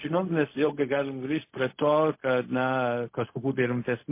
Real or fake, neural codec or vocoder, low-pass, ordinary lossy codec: fake; codec, 16 kHz, 0.4 kbps, LongCat-Audio-Codec; 3.6 kHz; MP3, 24 kbps